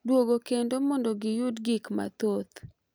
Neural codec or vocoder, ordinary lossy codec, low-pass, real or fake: none; none; none; real